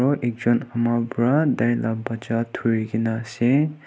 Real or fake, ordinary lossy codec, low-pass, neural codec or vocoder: real; none; none; none